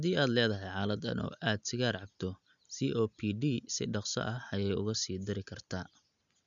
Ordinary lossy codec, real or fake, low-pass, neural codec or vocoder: none; real; 7.2 kHz; none